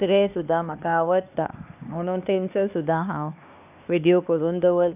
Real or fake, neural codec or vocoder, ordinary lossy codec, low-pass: fake; codec, 16 kHz, 2 kbps, X-Codec, HuBERT features, trained on LibriSpeech; none; 3.6 kHz